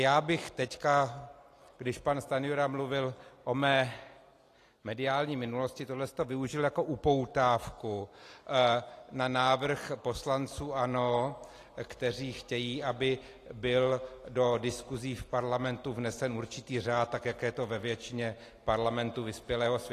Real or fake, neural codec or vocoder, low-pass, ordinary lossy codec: real; none; 14.4 kHz; AAC, 48 kbps